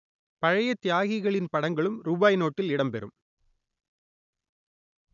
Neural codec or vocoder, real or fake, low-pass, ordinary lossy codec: none; real; 7.2 kHz; none